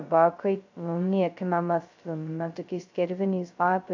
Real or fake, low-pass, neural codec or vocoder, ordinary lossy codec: fake; 7.2 kHz; codec, 16 kHz, 0.2 kbps, FocalCodec; AAC, 48 kbps